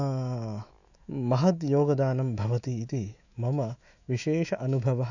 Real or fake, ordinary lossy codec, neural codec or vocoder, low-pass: fake; none; autoencoder, 48 kHz, 128 numbers a frame, DAC-VAE, trained on Japanese speech; 7.2 kHz